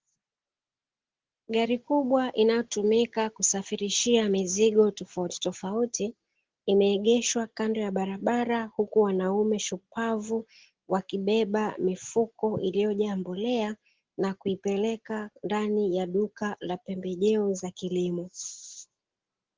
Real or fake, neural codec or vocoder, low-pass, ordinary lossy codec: real; none; 7.2 kHz; Opus, 16 kbps